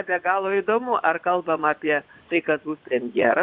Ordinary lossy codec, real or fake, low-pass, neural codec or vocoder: AAC, 48 kbps; fake; 5.4 kHz; vocoder, 24 kHz, 100 mel bands, Vocos